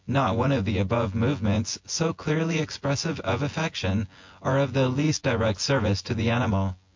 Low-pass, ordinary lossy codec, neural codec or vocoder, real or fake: 7.2 kHz; MP3, 48 kbps; vocoder, 24 kHz, 100 mel bands, Vocos; fake